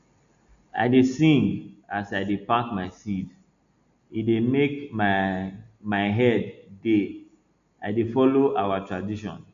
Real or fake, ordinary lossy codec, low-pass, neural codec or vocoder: real; none; 7.2 kHz; none